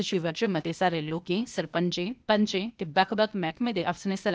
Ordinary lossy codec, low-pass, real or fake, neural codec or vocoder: none; none; fake; codec, 16 kHz, 0.8 kbps, ZipCodec